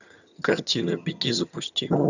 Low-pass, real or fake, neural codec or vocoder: 7.2 kHz; fake; vocoder, 22.05 kHz, 80 mel bands, HiFi-GAN